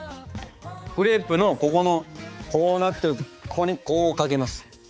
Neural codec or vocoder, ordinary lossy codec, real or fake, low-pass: codec, 16 kHz, 4 kbps, X-Codec, HuBERT features, trained on balanced general audio; none; fake; none